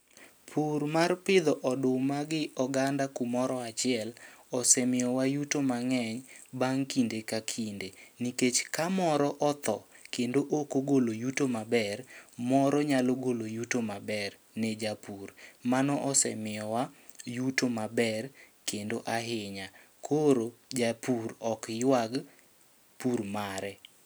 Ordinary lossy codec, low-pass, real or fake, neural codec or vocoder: none; none; real; none